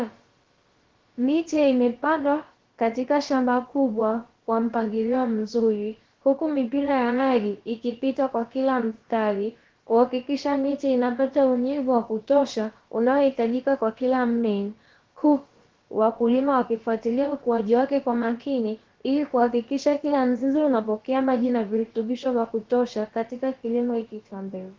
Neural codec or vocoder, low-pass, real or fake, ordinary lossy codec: codec, 16 kHz, about 1 kbps, DyCAST, with the encoder's durations; 7.2 kHz; fake; Opus, 16 kbps